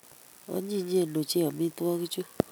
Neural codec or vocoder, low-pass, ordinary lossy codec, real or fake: none; none; none; real